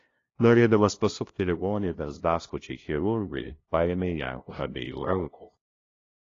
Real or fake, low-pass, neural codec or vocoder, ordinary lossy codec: fake; 7.2 kHz; codec, 16 kHz, 0.5 kbps, FunCodec, trained on LibriTTS, 25 frames a second; AAC, 32 kbps